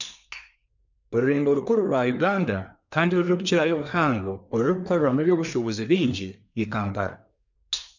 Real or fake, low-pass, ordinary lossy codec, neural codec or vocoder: fake; 7.2 kHz; none; codec, 24 kHz, 1 kbps, SNAC